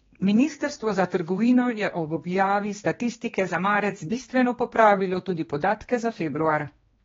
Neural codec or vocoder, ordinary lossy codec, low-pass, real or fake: codec, 16 kHz, 2 kbps, X-Codec, HuBERT features, trained on general audio; AAC, 24 kbps; 7.2 kHz; fake